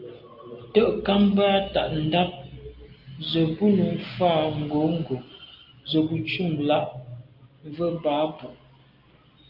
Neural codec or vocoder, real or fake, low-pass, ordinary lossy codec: none; real; 5.4 kHz; Opus, 32 kbps